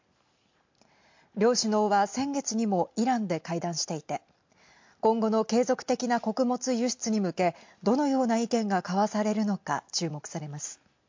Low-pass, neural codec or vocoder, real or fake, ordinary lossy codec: 7.2 kHz; none; real; MP3, 48 kbps